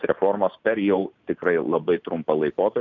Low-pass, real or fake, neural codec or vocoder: 7.2 kHz; real; none